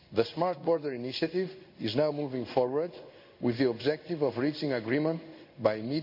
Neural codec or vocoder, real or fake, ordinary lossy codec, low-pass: codec, 16 kHz in and 24 kHz out, 1 kbps, XY-Tokenizer; fake; none; 5.4 kHz